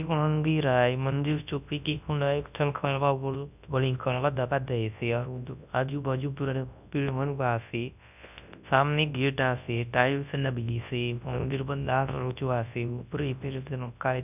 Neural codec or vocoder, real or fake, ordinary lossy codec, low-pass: codec, 24 kHz, 0.9 kbps, WavTokenizer, large speech release; fake; none; 3.6 kHz